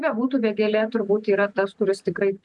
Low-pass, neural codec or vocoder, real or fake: 10.8 kHz; none; real